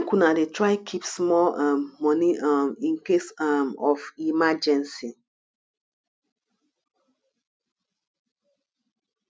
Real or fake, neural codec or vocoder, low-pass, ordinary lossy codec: real; none; none; none